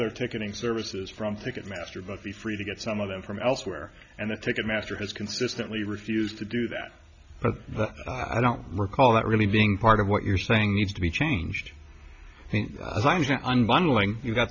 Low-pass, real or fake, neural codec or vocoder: 7.2 kHz; real; none